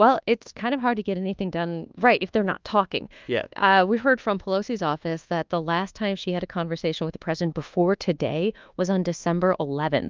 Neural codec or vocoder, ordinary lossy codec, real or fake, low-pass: codec, 24 kHz, 1.2 kbps, DualCodec; Opus, 24 kbps; fake; 7.2 kHz